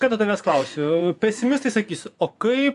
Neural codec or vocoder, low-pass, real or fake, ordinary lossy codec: vocoder, 24 kHz, 100 mel bands, Vocos; 10.8 kHz; fake; AAC, 96 kbps